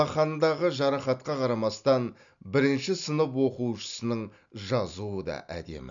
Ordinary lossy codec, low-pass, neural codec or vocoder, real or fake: none; 7.2 kHz; none; real